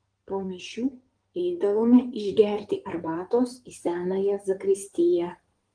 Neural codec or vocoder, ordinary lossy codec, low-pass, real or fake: codec, 16 kHz in and 24 kHz out, 2.2 kbps, FireRedTTS-2 codec; Opus, 24 kbps; 9.9 kHz; fake